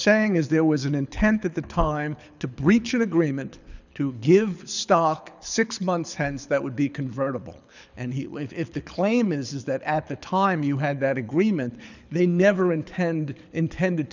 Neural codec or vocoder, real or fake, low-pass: codec, 24 kHz, 6 kbps, HILCodec; fake; 7.2 kHz